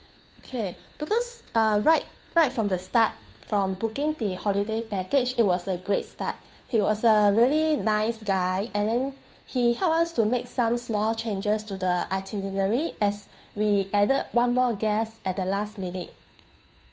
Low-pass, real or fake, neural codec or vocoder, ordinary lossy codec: none; fake; codec, 16 kHz, 2 kbps, FunCodec, trained on Chinese and English, 25 frames a second; none